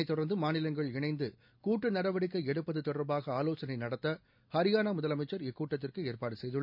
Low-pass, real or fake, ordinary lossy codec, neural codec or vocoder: 5.4 kHz; real; none; none